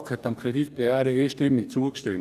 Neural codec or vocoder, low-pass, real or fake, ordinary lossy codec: codec, 44.1 kHz, 2.6 kbps, DAC; 14.4 kHz; fake; none